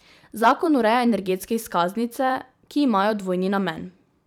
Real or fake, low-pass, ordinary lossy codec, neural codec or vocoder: fake; 19.8 kHz; none; vocoder, 44.1 kHz, 128 mel bands every 512 samples, BigVGAN v2